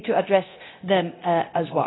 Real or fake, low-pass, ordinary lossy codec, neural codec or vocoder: fake; 7.2 kHz; AAC, 16 kbps; codec, 24 kHz, 0.9 kbps, DualCodec